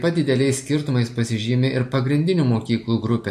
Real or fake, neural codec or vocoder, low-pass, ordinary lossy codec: real; none; 14.4 kHz; MP3, 64 kbps